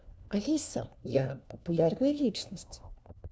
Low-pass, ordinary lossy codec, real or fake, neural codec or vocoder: none; none; fake; codec, 16 kHz, 1 kbps, FunCodec, trained on LibriTTS, 50 frames a second